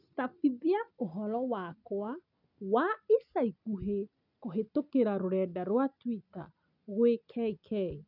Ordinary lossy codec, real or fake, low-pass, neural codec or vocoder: none; real; 5.4 kHz; none